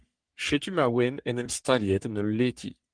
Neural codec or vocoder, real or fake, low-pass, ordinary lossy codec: codec, 44.1 kHz, 3.4 kbps, Pupu-Codec; fake; 9.9 kHz; Opus, 24 kbps